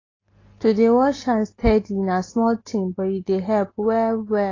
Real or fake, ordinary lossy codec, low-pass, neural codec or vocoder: real; AAC, 32 kbps; 7.2 kHz; none